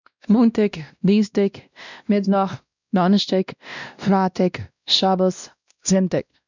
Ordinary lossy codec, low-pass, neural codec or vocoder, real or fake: none; 7.2 kHz; codec, 16 kHz, 1 kbps, X-Codec, WavLM features, trained on Multilingual LibriSpeech; fake